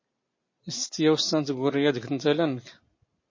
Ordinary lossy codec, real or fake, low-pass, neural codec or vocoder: MP3, 32 kbps; real; 7.2 kHz; none